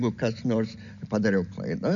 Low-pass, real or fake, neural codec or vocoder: 7.2 kHz; real; none